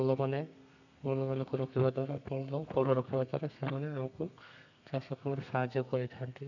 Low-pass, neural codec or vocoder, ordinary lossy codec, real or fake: 7.2 kHz; codec, 32 kHz, 1.9 kbps, SNAC; none; fake